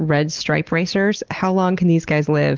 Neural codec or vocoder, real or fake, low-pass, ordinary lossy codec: none; real; 7.2 kHz; Opus, 16 kbps